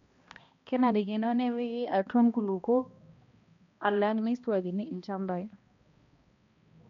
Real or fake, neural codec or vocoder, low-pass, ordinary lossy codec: fake; codec, 16 kHz, 1 kbps, X-Codec, HuBERT features, trained on balanced general audio; 7.2 kHz; MP3, 48 kbps